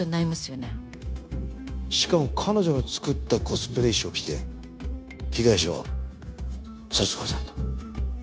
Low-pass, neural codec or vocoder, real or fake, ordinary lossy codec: none; codec, 16 kHz, 0.9 kbps, LongCat-Audio-Codec; fake; none